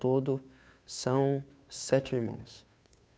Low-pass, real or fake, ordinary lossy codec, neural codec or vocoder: none; fake; none; codec, 16 kHz, 2 kbps, FunCodec, trained on Chinese and English, 25 frames a second